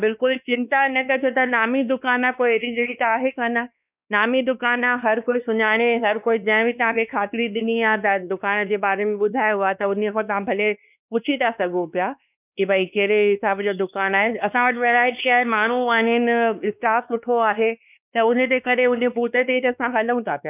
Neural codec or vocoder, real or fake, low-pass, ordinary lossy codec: codec, 16 kHz, 2 kbps, X-Codec, WavLM features, trained on Multilingual LibriSpeech; fake; 3.6 kHz; none